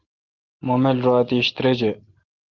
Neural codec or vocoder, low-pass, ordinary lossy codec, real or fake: none; 7.2 kHz; Opus, 16 kbps; real